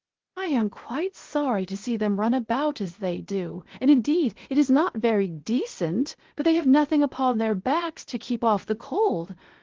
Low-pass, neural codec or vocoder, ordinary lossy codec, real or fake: 7.2 kHz; codec, 16 kHz, 0.8 kbps, ZipCodec; Opus, 16 kbps; fake